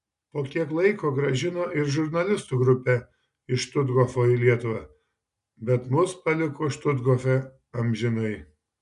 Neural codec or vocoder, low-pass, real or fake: none; 10.8 kHz; real